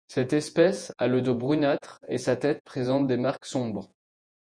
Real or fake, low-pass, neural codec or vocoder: fake; 9.9 kHz; vocoder, 48 kHz, 128 mel bands, Vocos